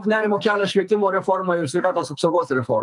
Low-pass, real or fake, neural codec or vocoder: 10.8 kHz; fake; codec, 44.1 kHz, 2.6 kbps, SNAC